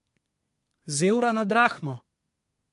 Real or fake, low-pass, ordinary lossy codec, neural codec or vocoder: fake; 10.8 kHz; MP3, 64 kbps; codec, 24 kHz, 1 kbps, SNAC